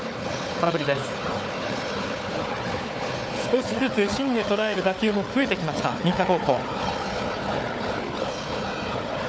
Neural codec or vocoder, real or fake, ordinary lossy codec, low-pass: codec, 16 kHz, 4 kbps, FunCodec, trained on Chinese and English, 50 frames a second; fake; none; none